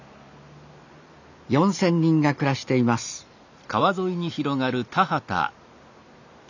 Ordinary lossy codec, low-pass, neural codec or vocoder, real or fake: none; 7.2 kHz; none; real